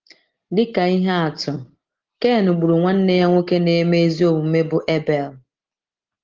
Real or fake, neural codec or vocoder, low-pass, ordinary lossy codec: real; none; 7.2 kHz; Opus, 16 kbps